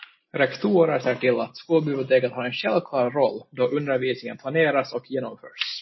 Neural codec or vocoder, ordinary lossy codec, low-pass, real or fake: none; MP3, 24 kbps; 7.2 kHz; real